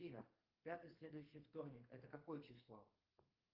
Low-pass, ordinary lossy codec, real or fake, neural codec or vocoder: 5.4 kHz; Opus, 32 kbps; fake; codec, 32 kHz, 1.9 kbps, SNAC